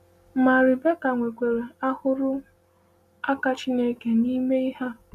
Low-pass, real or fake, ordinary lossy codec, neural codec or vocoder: 14.4 kHz; real; none; none